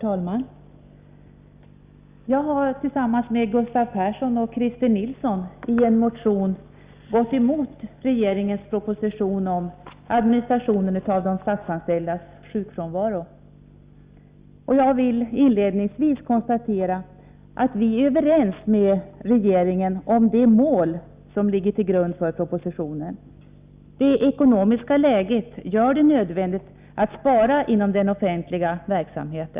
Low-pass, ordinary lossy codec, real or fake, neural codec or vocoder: 3.6 kHz; Opus, 64 kbps; real; none